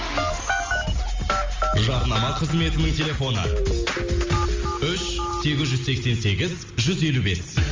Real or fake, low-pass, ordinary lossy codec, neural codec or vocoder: real; 7.2 kHz; Opus, 32 kbps; none